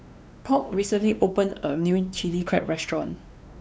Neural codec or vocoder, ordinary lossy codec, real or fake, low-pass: codec, 16 kHz, 2 kbps, X-Codec, WavLM features, trained on Multilingual LibriSpeech; none; fake; none